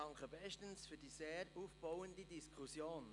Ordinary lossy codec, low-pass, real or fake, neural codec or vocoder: none; 10.8 kHz; real; none